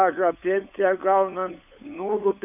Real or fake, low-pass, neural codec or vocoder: fake; 3.6 kHz; codec, 16 kHz, 8 kbps, FunCodec, trained on LibriTTS, 25 frames a second